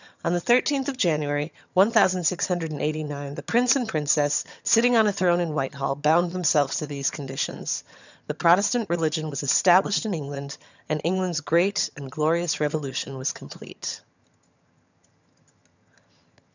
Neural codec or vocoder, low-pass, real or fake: vocoder, 22.05 kHz, 80 mel bands, HiFi-GAN; 7.2 kHz; fake